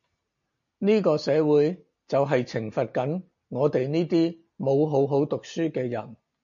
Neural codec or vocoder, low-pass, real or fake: none; 7.2 kHz; real